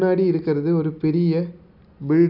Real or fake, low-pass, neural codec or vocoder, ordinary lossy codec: real; 5.4 kHz; none; none